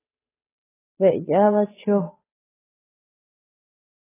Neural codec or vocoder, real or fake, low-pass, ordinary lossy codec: codec, 16 kHz, 2 kbps, FunCodec, trained on Chinese and English, 25 frames a second; fake; 3.6 kHz; AAC, 16 kbps